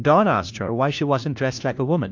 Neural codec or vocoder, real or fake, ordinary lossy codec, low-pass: codec, 16 kHz, 1 kbps, FunCodec, trained on LibriTTS, 50 frames a second; fake; AAC, 48 kbps; 7.2 kHz